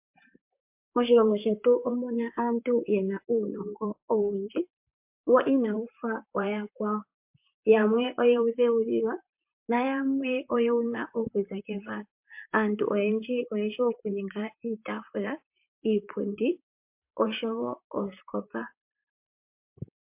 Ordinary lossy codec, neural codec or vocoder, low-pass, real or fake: MP3, 32 kbps; vocoder, 44.1 kHz, 128 mel bands, Pupu-Vocoder; 3.6 kHz; fake